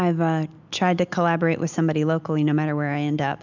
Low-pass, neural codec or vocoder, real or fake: 7.2 kHz; none; real